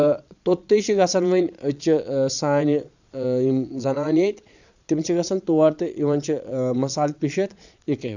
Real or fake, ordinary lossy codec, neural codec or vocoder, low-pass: fake; none; vocoder, 22.05 kHz, 80 mel bands, Vocos; 7.2 kHz